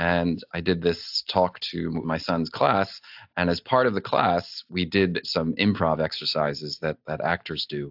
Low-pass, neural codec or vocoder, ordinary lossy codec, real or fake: 5.4 kHz; none; AAC, 48 kbps; real